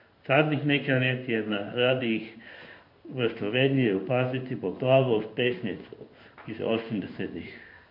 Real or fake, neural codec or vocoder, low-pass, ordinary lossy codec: fake; codec, 16 kHz in and 24 kHz out, 1 kbps, XY-Tokenizer; 5.4 kHz; MP3, 48 kbps